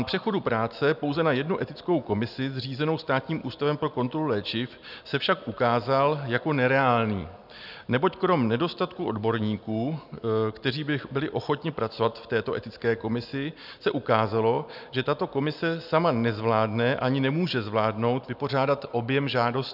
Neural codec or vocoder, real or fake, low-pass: none; real; 5.4 kHz